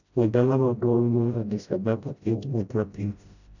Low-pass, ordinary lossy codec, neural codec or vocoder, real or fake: 7.2 kHz; none; codec, 16 kHz, 0.5 kbps, FreqCodec, smaller model; fake